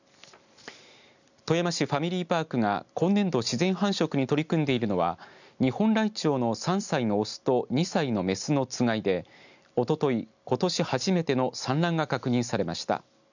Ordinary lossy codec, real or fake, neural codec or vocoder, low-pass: none; real; none; 7.2 kHz